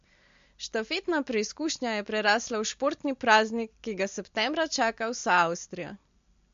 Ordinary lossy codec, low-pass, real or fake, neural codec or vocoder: MP3, 48 kbps; 7.2 kHz; real; none